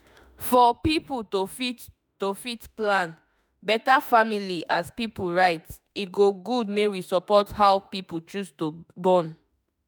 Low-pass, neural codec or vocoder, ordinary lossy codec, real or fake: none; autoencoder, 48 kHz, 32 numbers a frame, DAC-VAE, trained on Japanese speech; none; fake